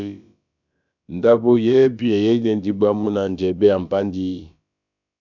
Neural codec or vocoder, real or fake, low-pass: codec, 16 kHz, about 1 kbps, DyCAST, with the encoder's durations; fake; 7.2 kHz